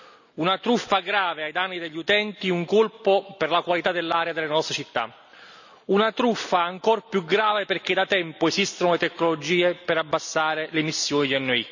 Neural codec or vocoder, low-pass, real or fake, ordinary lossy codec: none; 7.2 kHz; real; none